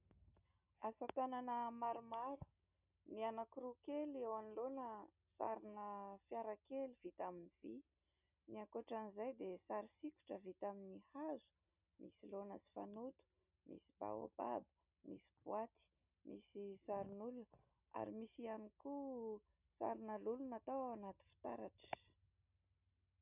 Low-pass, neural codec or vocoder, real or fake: 3.6 kHz; none; real